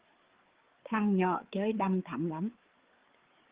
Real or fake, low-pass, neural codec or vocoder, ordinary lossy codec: fake; 3.6 kHz; codec, 16 kHz, 4 kbps, FreqCodec, larger model; Opus, 16 kbps